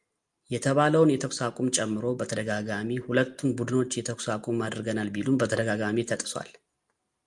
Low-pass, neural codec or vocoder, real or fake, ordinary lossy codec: 10.8 kHz; none; real; Opus, 32 kbps